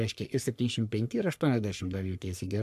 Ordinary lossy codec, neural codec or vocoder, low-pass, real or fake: MP3, 96 kbps; codec, 44.1 kHz, 3.4 kbps, Pupu-Codec; 14.4 kHz; fake